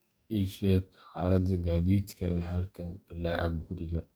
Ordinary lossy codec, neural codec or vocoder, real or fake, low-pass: none; codec, 44.1 kHz, 2.6 kbps, DAC; fake; none